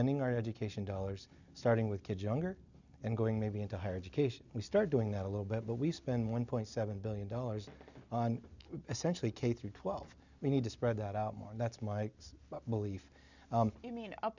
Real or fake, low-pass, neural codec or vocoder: real; 7.2 kHz; none